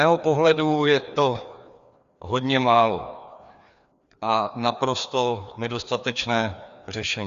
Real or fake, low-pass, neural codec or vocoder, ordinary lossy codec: fake; 7.2 kHz; codec, 16 kHz, 2 kbps, FreqCodec, larger model; Opus, 64 kbps